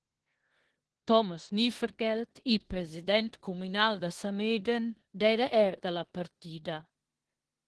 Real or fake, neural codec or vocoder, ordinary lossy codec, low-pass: fake; codec, 16 kHz in and 24 kHz out, 0.9 kbps, LongCat-Audio-Codec, four codebook decoder; Opus, 16 kbps; 10.8 kHz